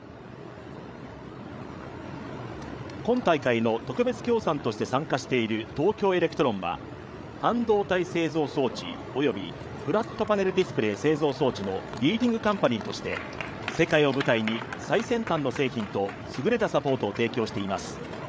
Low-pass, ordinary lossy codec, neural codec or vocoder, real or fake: none; none; codec, 16 kHz, 8 kbps, FreqCodec, larger model; fake